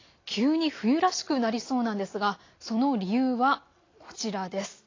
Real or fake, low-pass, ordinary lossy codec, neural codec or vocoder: real; 7.2 kHz; AAC, 32 kbps; none